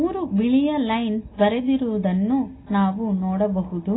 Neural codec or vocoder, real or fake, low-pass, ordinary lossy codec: none; real; 7.2 kHz; AAC, 16 kbps